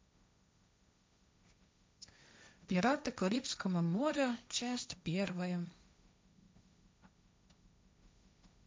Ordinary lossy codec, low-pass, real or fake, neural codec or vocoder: none; none; fake; codec, 16 kHz, 1.1 kbps, Voila-Tokenizer